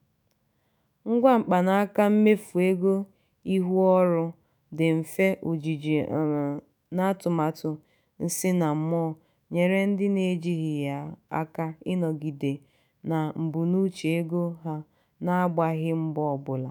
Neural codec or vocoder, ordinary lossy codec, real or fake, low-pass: autoencoder, 48 kHz, 128 numbers a frame, DAC-VAE, trained on Japanese speech; none; fake; none